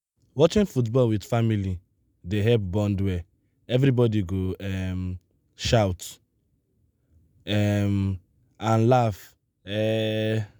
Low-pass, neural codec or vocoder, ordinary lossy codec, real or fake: none; none; none; real